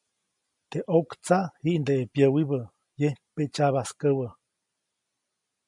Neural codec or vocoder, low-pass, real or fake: none; 10.8 kHz; real